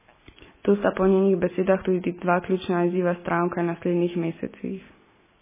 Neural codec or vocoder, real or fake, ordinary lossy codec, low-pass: none; real; MP3, 16 kbps; 3.6 kHz